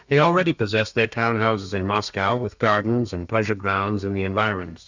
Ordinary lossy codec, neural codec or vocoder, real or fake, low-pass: Opus, 64 kbps; codec, 32 kHz, 1.9 kbps, SNAC; fake; 7.2 kHz